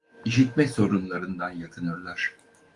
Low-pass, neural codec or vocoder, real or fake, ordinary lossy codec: 10.8 kHz; autoencoder, 48 kHz, 128 numbers a frame, DAC-VAE, trained on Japanese speech; fake; Opus, 32 kbps